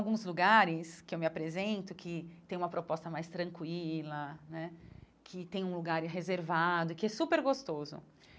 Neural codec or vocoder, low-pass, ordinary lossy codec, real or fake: none; none; none; real